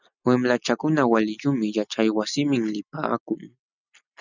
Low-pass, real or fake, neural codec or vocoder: 7.2 kHz; real; none